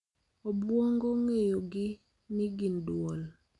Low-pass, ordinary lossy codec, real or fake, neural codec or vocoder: 10.8 kHz; none; real; none